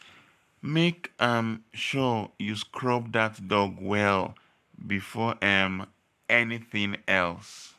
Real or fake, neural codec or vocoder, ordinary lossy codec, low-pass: fake; codec, 44.1 kHz, 7.8 kbps, Pupu-Codec; none; 14.4 kHz